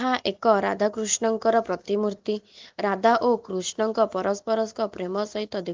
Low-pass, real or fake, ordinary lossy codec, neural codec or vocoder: 7.2 kHz; real; Opus, 32 kbps; none